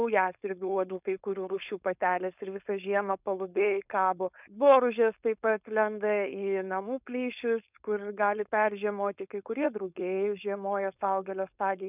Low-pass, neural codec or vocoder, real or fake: 3.6 kHz; codec, 16 kHz, 4.8 kbps, FACodec; fake